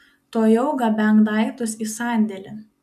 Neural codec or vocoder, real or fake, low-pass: none; real; 14.4 kHz